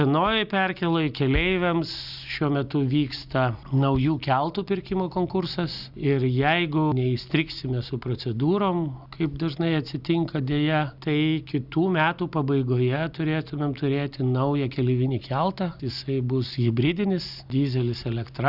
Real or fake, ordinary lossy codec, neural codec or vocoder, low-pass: real; Opus, 64 kbps; none; 5.4 kHz